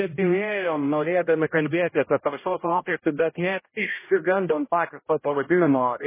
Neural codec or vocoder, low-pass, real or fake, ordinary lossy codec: codec, 16 kHz, 0.5 kbps, X-Codec, HuBERT features, trained on general audio; 3.6 kHz; fake; MP3, 16 kbps